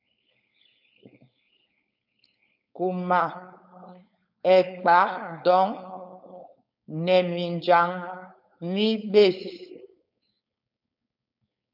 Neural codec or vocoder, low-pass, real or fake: codec, 16 kHz, 4.8 kbps, FACodec; 5.4 kHz; fake